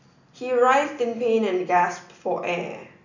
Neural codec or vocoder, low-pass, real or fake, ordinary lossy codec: none; 7.2 kHz; real; none